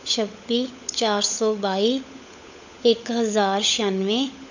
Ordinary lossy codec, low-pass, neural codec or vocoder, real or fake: none; 7.2 kHz; codec, 16 kHz, 16 kbps, FunCodec, trained on LibriTTS, 50 frames a second; fake